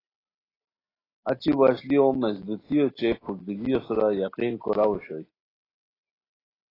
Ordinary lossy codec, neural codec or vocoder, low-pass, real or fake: AAC, 24 kbps; none; 5.4 kHz; real